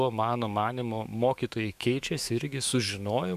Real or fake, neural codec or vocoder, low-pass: fake; autoencoder, 48 kHz, 128 numbers a frame, DAC-VAE, trained on Japanese speech; 14.4 kHz